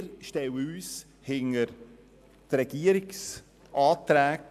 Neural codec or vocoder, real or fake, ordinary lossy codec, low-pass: none; real; AAC, 96 kbps; 14.4 kHz